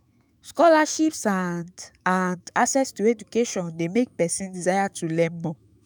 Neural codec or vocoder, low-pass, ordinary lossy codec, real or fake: autoencoder, 48 kHz, 128 numbers a frame, DAC-VAE, trained on Japanese speech; none; none; fake